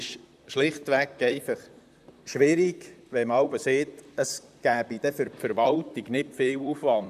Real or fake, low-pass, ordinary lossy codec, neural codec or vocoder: fake; 14.4 kHz; none; vocoder, 44.1 kHz, 128 mel bands, Pupu-Vocoder